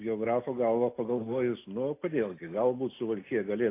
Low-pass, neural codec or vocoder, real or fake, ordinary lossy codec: 3.6 kHz; none; real; AAC, 24 kbps